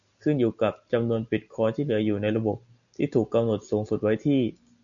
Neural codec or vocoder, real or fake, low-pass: none; real; 7.2 kHz